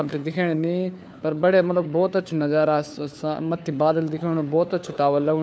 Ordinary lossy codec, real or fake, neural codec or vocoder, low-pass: none; fake; codec, 16 kHz, 4 kbps, FunCodec, trained on LibriTTS, 50 frames a second; none